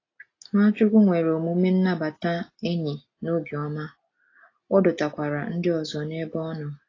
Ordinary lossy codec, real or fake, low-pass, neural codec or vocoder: AAC, 32 kbps; real; 7.2 kHz; none